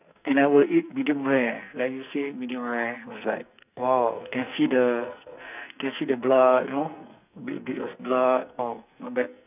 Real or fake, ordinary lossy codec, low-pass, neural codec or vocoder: fake; none; 3.6 kHz; codec, 44.1 kHz, 2.6 kbps, SNAC